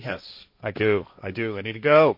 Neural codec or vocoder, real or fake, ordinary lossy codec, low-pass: codec, 16 kHz, 1.1 kbps, Voila-Tokenizer; fake; MP3, 32 kbps; 5.4 kHz